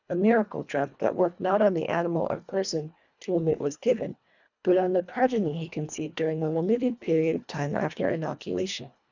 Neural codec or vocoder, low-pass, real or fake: codec, 24 kHz, 1.5 kbps, HILCodec; 7.2 kHz; fake